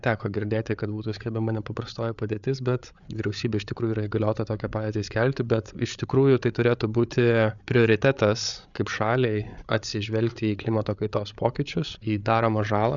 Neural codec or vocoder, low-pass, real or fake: codec, 16 kHz, 8 kbps, FreqCodec, larger model; 7.2 kHz; fake